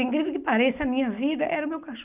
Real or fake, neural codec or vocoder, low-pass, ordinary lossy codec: real; none; 3.6 kHz; none